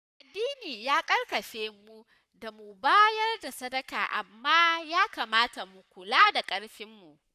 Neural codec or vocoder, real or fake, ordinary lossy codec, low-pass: codec, 44.1 kHz, 7.8 kbps, Pupu-Codec; fake; none; 14.4 kHz